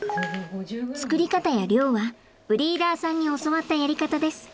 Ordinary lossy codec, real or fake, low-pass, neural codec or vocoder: none; real; none; none